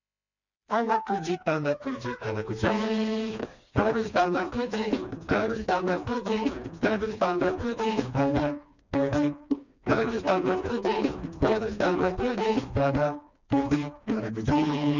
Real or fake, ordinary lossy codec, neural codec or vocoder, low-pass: fake; none; codec, 16 kHz, 2 kbps, FreqCodec, smaller model; 7.2 kHz